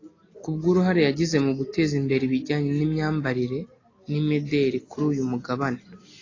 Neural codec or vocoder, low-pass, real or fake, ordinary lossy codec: none; 7.2 kHz; real; AAC, 32 kbps